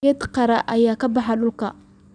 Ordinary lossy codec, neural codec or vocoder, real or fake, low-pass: none; none; real; 9.9 kHz